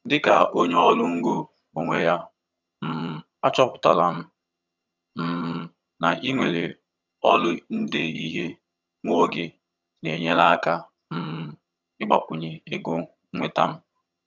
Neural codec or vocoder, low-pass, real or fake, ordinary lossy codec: vocoder, 22.05 kHz, 80 mel bands, HiFi-GAN; 7.2 kHz; fake; none